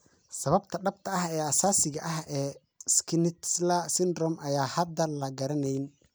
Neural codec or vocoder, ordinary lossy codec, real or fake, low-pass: none; none; real; none